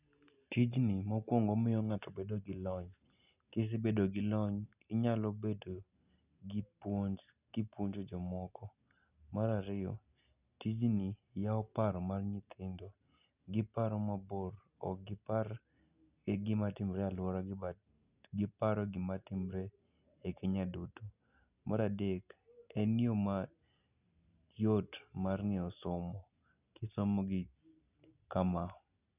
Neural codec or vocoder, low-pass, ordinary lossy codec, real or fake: none; 3.6 kHz; none; real